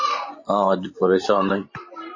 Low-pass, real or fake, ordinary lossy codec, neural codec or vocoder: 7.2 kHz; fake; MP3, 32 kbps; vocoder, 44.1 kHz, 128 mel bands every 512 samples, BigVGAN v2